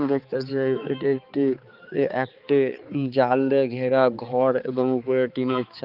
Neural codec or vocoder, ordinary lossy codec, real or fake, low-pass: codec, 16 kHz, 4 kbps, X-Codec, HuBERT features, trained on balanced general audio; Opus, 24 kbps; fake; 5.4 kHz